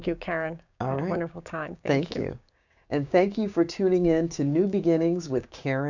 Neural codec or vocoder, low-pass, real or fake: codec, 16 kHz, 6 kbps, DAC; 7.2 kHz; fake